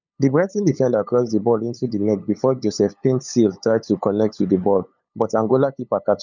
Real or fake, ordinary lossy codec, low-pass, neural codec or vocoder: fake; none; 7.2 kHz; codec, 16 kHz, 8 kbps, FunCodec, trained on LibriTTS, 25 frames a second